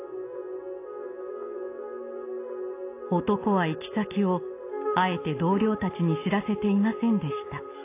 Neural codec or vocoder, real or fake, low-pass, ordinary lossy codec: none; real; 3.6 kHz; AAC, 24 kbps